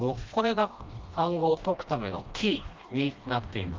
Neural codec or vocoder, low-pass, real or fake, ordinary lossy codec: codec, 16 kHz, 1 kbps, FreqCodec, smaller model; 7.2 kHz; fake; Opus, 32 kbps